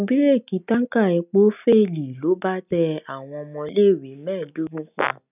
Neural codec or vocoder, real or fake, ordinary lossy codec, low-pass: none; real; none; 3.6 kHz